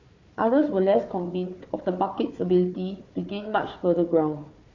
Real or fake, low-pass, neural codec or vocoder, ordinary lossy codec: fake; 7.2 kHz; codec, 16 kHz, 4 kbps, FunCodec, trained on Chinese and English, 50 frames a second; MP3, 48 kbps